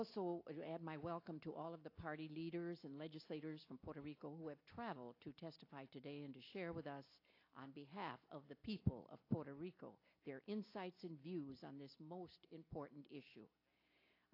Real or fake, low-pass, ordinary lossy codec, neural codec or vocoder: real; 5.4 kHz; AAC, 32 kbps; none